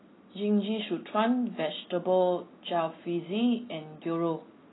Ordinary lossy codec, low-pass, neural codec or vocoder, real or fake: AAC, 16 kbps; 7.2 kHz; none; real